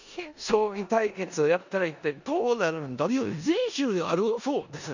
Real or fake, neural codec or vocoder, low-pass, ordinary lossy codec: fake; codec, 16 kHz in and 24 kHz out, 0.9 kbps, LongCat-Audio-Codec, four codebook decoder; 7.2 kHz; none